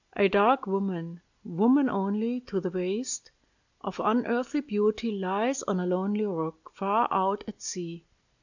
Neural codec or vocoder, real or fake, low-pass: none; real; 7.2 kHz